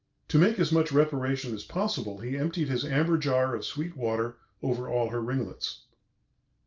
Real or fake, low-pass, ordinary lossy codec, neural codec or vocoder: real; 7.2 kHz; Opus, 24 kbps; none